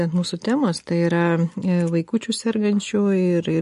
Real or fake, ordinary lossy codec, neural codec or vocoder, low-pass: real; MP3, 48 kbps; none; 14.4 kHz